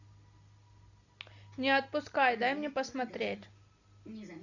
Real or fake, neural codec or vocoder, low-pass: real; none; 7.2 kHz